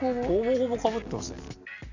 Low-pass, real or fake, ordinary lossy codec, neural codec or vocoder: 7.2 kHz; real; none; none